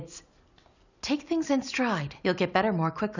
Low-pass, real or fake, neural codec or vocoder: 7.2 kHz; real; none